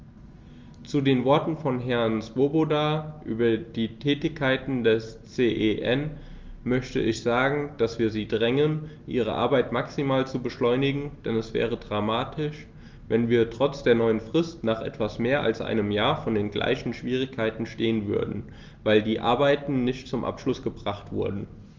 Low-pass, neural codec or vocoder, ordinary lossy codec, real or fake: 7.2 kHz; none; Opus, 32 kbps; real